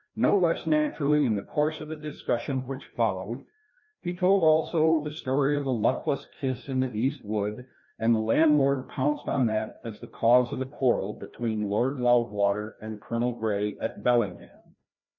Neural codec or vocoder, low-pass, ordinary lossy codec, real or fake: codec, 16 kHz, 1 kbps, FreqCodec, larger model; 7.2 kHz; MP3, 32 kbps; fake